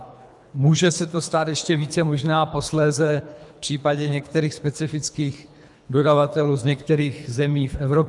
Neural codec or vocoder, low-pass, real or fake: codec, 24 kHz, 3 kbps, HILCodec; 10.8 kHz; fake